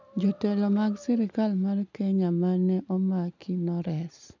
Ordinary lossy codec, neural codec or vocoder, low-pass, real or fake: none; none; 7.2 kHz; real